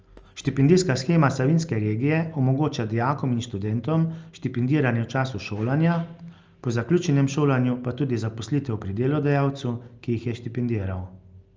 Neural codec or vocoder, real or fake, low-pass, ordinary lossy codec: none; real; 7.2 kHz; Opus, 24 kbps